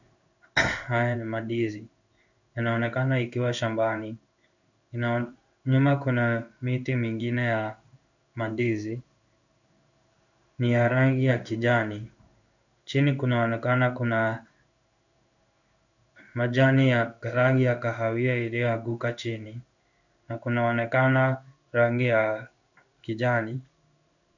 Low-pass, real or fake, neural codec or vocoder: 7.2 kHz; fake; codec, 16 kHz in and 24 kHz out, 1 kbps, XY-Tokenizer